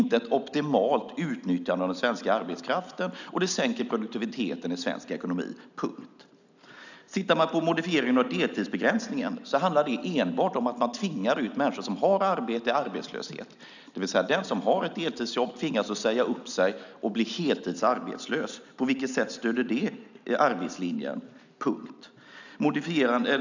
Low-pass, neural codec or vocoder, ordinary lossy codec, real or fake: 7.2 kHz; none; none; real